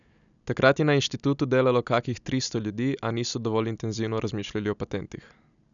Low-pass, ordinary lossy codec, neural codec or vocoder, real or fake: 7.2 kHz; none; none; real